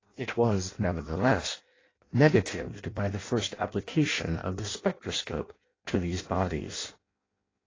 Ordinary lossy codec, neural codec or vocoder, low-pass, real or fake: AAC, 32 kbps; codec, 16 kHz in and 24 kHz out, 0.6 kbps, FireRedTTS-2 codec; 7.2 kHz; fake